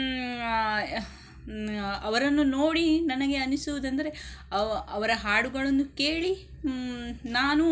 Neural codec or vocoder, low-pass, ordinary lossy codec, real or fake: none; none; none; real